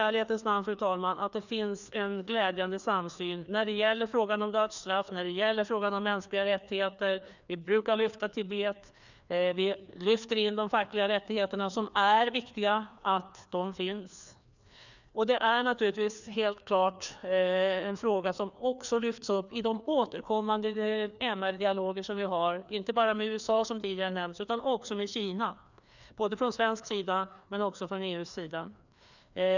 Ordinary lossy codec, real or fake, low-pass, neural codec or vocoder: none; fake; 7.2 kHz; codec, 16 kHz, 2 kbps, FreqCodec, larger model